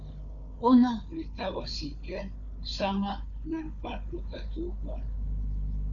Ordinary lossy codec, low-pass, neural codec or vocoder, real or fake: MP3, 96 kbps; 7.2 kHz; codec, 16 kHz, 4 kbps, FunCodec, trained on Chinese and English, 50 frames a second; fake